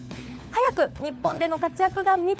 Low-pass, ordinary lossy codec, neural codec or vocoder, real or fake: none; none; codec, 16 kHz, 4 kbps, FunCodec, trained on LibriTTS, 50 frames a second; fake